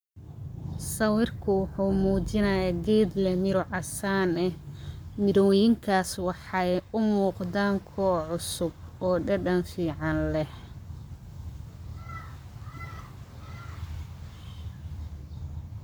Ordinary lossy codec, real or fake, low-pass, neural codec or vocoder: none; fake; none; codec, 44.1 kHz, 7.8 kbps, Pupu-Codec